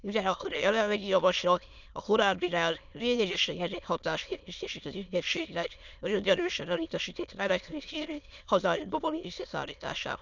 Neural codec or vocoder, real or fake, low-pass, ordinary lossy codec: autoencoder, 22.05 kHz, a latent of 192 numbers a frame, VITS, trained on many speakers; fake; 7.2 kHz; none